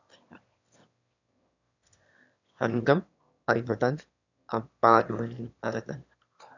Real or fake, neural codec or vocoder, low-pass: fake; autoencoder, 22.05 kHz, a latent of 192 numbers a frame, VITS, trained on one speaker; 7.2 kHz